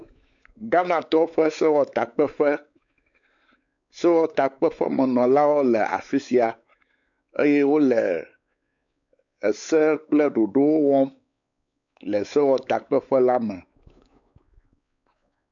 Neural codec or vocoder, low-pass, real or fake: codec, 16 kHz, 4 kbps, X-Codec, WavLM features, trained on Multilingual LibriSpeech; 7.2 kHz; fake